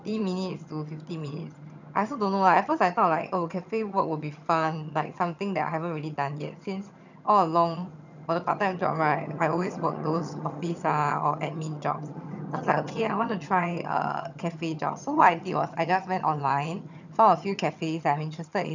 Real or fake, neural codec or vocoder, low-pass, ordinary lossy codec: fake; vocoder, 22.05 kHz, 80 mel bands, HiFi-GAN; 7.2 kHz; none